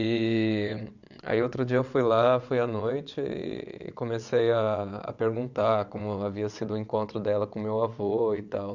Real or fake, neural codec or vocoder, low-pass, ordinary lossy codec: fake; vocoder, 22.05 kHz, 80 mel bands, WaveNeXt; 7.2 kHz; none